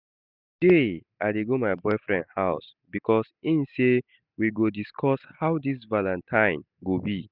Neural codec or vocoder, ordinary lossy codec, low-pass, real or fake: none; none; 5.4 kHz; real